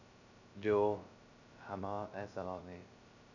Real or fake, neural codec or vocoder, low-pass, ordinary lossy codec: fake; codec, 16 kHz, 0.2 kbps, FocalCodec; 7.2 kHz; none